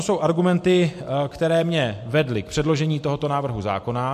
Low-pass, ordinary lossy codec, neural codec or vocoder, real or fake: 14.4 kHz; MP3, 64 kbps; none; real